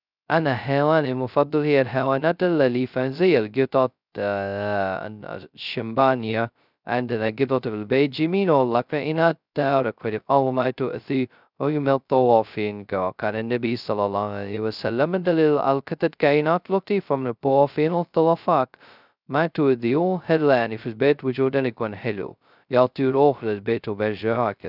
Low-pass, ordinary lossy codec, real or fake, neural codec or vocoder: 5.4 kHz; none; fake; codec, 16 kHz, 0.2 kbps, FocalCodec